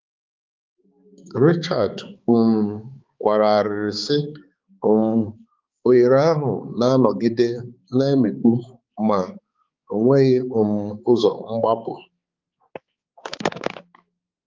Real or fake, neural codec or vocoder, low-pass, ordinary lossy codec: fake; codec, 16 kHz, 4 kbps, X-Codec, HuBERT features, trained on balanced general audio; 7.2 kHz; Opus, 32 kbps